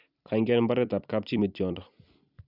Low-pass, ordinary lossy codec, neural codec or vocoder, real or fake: 5.4 kHz; none; vocoder, 44.1 kHz, 128 mel bands every 256 samples, BigVGAN v2; fake